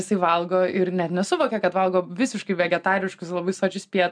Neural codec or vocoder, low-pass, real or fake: none; 9.9 kHz; real